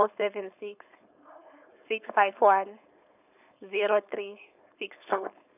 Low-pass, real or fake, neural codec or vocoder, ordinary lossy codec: 3.6 kHz; fake; codec, 16 kHz, 4.8 kbps, FACodec; none